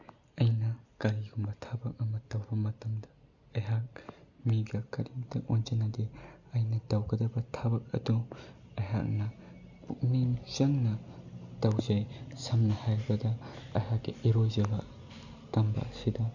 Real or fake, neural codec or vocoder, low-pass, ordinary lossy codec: real; none; 7.2 kHz; none